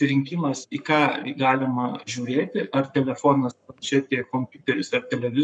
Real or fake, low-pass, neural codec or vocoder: fake; 9.9 kHz; codec, 44.1 kHz, 7.8 kbps, Pupu-Codec